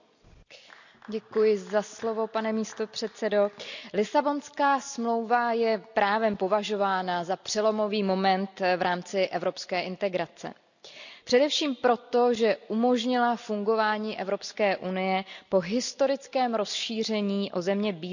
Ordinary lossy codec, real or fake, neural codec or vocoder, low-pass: none; real; none; 7.2 kHz